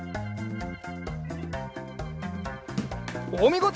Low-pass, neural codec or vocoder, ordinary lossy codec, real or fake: none; none; none; real